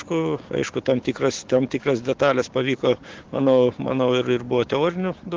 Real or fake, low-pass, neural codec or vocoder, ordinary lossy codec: fake; 7.2 kHz; codec, 16 kHz, 6 kbps, DAC; Opus, 16 kbps